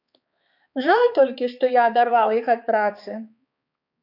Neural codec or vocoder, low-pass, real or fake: codec, 16 kHz, 4 kbps, X-Codec, HuBERT features, trained on balanced general audio; 5.4 kHz; fake